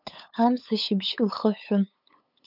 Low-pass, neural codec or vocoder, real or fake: 5.4 kHz; codec, 16 kHz, 8 kbps, FunCodec, trained on LibriTTS, 25 frames a second; fake